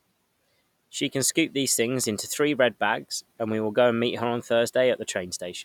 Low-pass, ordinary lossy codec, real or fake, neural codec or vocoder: 19.8 kHz; none; real; none